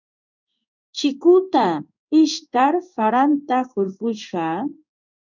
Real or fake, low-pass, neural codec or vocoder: fake; 7.2 kHz; codec, 16 kHz in and 24 kHz out, 1 kbps, XY-Tokenizer